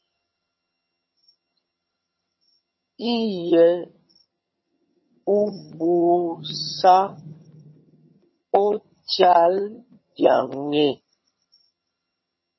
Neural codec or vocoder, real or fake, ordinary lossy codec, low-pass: vocoder, 22.05 kHz, 80 mel bands, HiFi-GAN; fake; MP3, 24 kbps; 7.2 kHz